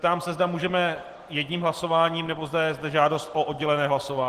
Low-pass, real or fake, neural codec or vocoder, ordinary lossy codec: 14.4 kHz; real; none; Opus, 16 kbps